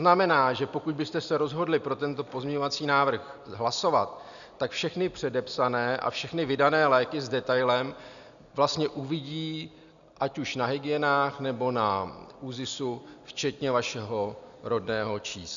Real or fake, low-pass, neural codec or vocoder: real; 7.2 kHz; none